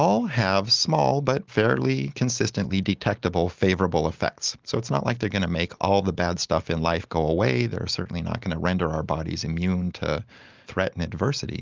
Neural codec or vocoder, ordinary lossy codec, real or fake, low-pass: none; Opus, 24 kbps; real; 7.2 kHz